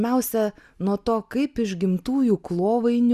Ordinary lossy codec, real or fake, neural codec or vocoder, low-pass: Opus, 64 kbps; real; none; 14.4 kHz